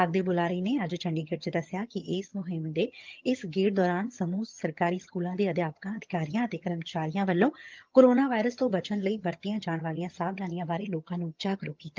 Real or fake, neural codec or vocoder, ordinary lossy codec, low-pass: fake; vocoder, 22.05 kHz, 80 mel bands, HiFi-GAN; Opus, 32 kbps; 7.2 kHz